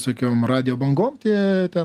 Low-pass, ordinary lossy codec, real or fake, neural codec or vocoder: 14.4 kHz; Opus, 32 kbps; real; none